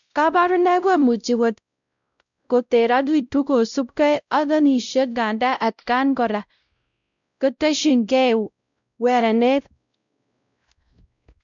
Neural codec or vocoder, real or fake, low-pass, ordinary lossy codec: codec, 16 kHz, 0.5 kbps, X-Codec, WavLM features, trained on Multilingual LibriSpeech; fake; 7.2 kHz; none